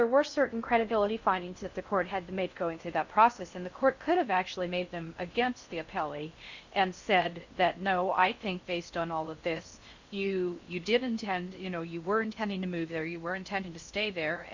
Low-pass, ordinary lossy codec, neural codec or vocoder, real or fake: 7.2 kHz; Opus, 64 kbps; codec, 16 kHz in and 24 kHz out, 0.6 kbps, FocalCodec, streaming, 4096 codes; fake